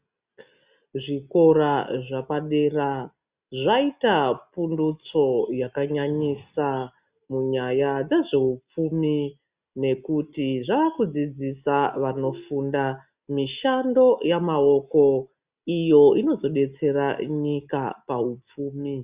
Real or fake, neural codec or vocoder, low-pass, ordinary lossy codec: real; none; 3.6 kHz; Opus, 64 kbps